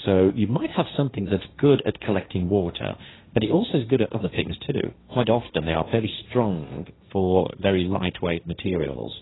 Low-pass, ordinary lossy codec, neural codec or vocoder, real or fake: 7.2 kHz; AAC, 16 kbps; codec, 16 kHz, 1.1 kbps, Voila-Tokenizer; fake